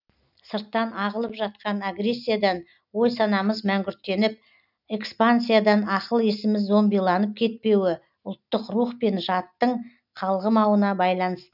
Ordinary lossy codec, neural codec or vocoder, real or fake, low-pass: none; none; real; 5.4 kHz